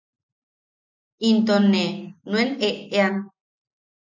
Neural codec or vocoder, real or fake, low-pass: none; real; 7.2 kHz